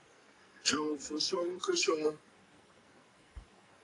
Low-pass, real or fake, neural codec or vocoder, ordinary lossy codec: 10.8 kHz; fake; codec, 44.1 kHz, 2.6 kbps, SNAC; AAC, 48 kbps